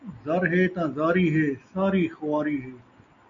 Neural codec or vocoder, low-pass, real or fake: none; 7.2 kHz; real